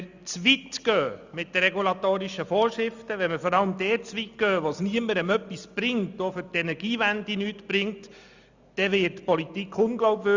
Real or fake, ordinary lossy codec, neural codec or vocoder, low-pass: real; Opus, 64 kbps; none; 7.2 kHz